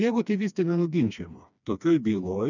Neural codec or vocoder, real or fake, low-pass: codec, 16 kHz, 2 kbps, FreqCodec, smaller model; fake; 7.2 kHz